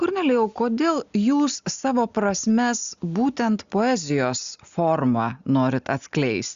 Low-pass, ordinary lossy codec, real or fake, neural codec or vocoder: 7.2 kHz; Opus, 64 kbps; real; none